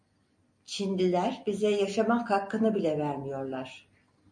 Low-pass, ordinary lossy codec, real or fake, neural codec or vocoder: 9.9 kHz; MP3, 48 kbps; real; none